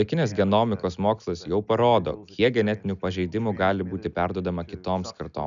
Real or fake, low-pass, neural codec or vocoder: real; 7.2 kHz; none